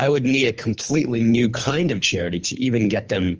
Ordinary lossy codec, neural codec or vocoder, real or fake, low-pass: Opus, 24 kbps; codec, 24 kHz, 3 kbps, HILCodec; fake; 7.2 kHz